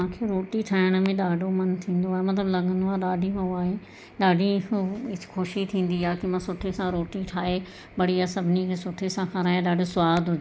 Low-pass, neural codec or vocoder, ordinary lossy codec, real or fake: none; none; none; real